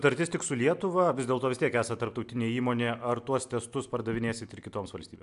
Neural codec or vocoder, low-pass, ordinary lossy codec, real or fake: none; 10.8 kHz; AAC, 96 kbps; real